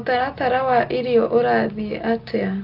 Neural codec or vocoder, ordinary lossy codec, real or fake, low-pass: none; Opus, 32 kbps; real; 5.4 kHz